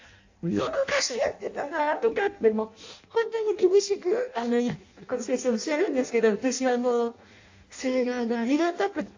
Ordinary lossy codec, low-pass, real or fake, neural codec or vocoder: AAC, 48 kbps; 7.2 kHz; fake; codec, 16 kHz in and 24 kHz out, 0.6 kbps, FireRedTTS-2 codec